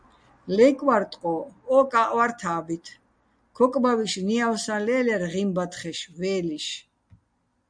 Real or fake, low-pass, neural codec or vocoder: real; 9.9 kHz; none